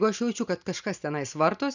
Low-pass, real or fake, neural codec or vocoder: 7.2 kHz; real; none